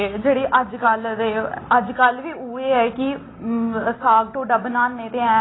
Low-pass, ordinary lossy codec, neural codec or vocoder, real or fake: 7.2 kHz; AAC, 16 kbps; none; real